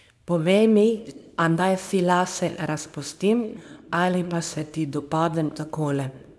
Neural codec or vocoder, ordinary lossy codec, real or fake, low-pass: codec, 24 kHz, 0.9 kbps, WavTokenizer, small release; none; fake; none